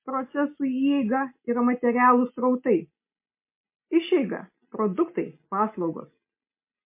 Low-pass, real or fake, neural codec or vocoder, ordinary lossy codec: 3.6 kHz; real; none; MP3, 24 kbps